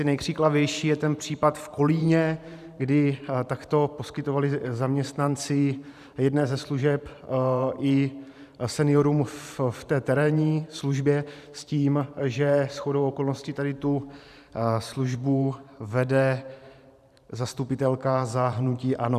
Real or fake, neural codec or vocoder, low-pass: fake; vocoder, 44.1 kHz, 128 mel bands every 512 samples, BigVGAN v2; 14.4 kHz